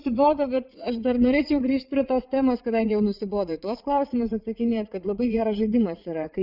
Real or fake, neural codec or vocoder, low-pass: fake; vocoder, 44.1 kHz, 80 mel bands, Vocos; 5.4 kHz